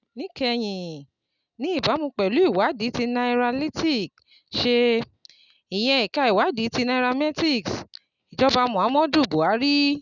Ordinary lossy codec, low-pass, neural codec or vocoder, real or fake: none; 7.2 kHz; none; real